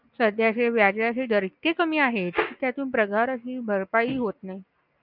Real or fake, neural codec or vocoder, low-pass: real; none; 5.4 kHz